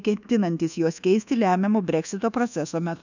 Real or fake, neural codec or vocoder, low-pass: fake; codec, 24 kHz, 1.2 kbps, DualCodec; 7.2 kHz